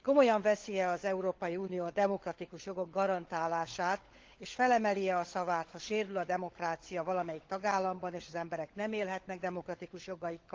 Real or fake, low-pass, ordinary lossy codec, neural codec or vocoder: fake; 7.2 kHz; Opus, 16 kbps; codec, 16 kHz, 16 kbps, FunCodec, trained on LibriTTS, 50 frames a second